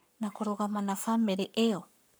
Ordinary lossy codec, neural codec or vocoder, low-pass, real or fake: none; codec, 44.1 kHz, 7.8 kbps, Pupu-Codec; none; fake